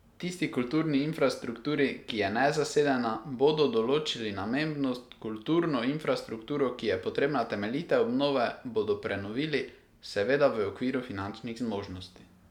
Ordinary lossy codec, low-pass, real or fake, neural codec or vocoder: none; 19.8 kHz; real; none